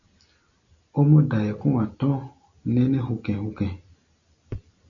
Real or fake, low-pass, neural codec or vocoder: real; 7.2 kHz; none